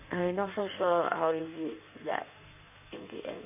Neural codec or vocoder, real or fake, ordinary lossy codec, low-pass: codec, 16 kHz in and 24 kHz out, 1.1 kbps, FireRedTTS-2 codec; fake; none; 3.6 kHz